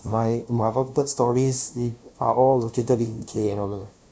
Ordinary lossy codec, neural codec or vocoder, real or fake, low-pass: none; codec, 16 kHz, 0.5 kbps, FunCodec, trained on LibriTTS, 25 frames a second; fake; none